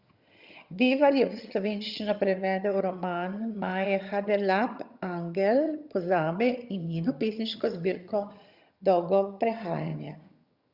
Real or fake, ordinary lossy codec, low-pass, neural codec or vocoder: fake; Opus, 64 kbps; 5.4 kHz; vocoder, 22.05 kHz, 80 mel bands, HiFi-GAN